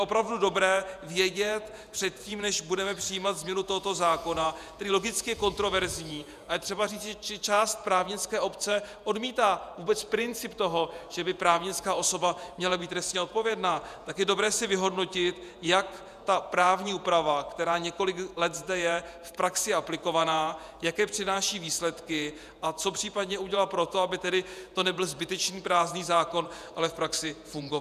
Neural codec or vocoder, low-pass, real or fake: vocoder, 48 kHz, 128 mel bands, Vocos; 14.4 kHz; fake